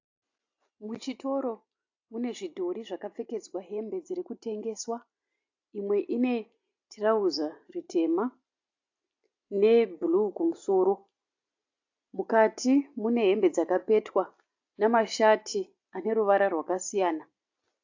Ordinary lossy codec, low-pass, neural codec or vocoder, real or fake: AAC, 48 kbps; 7.2 kHz; none; real